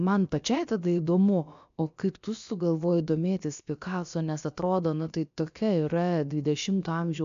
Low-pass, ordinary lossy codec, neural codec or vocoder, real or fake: 7.2 kHz; MP3, 64 kbps; codec, 16 kHz, about 1 kbps, DyCAST, with the encoder's durations; fake